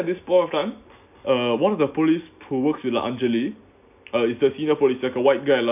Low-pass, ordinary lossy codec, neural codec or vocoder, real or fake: 3.6 kHz; none; none; real